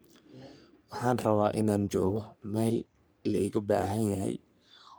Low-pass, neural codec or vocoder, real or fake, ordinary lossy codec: none; codec, 44.1 kHz, 3.4 kbps, Pupu-Codec; fake; none